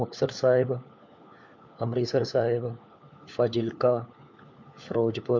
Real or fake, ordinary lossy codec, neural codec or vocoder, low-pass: fake; MP3, 48 kbps; codec, 16 kHz, 4 kbps, FunCodec, trained on LibriTTS, 50 frames a second; 7.2 kHz